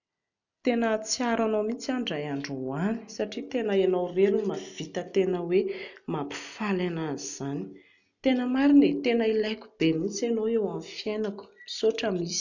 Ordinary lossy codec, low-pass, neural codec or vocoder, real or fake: none; 7.2 kHz; none; real